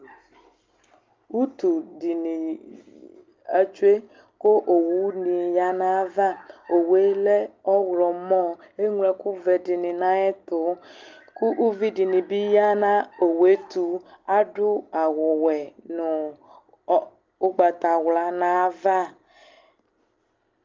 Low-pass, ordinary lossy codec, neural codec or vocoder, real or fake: 7.2 kHz; Opus, 24 kbps; none; real